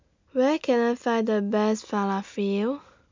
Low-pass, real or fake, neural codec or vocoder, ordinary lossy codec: 7.2 kHz; real; none; MP3, 64 kbps